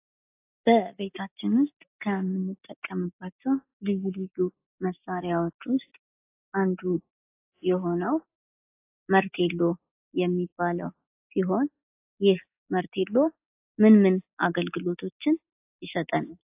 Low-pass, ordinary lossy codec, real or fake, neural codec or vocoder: 3.6 kHz; AAC, 24 kbps; real; none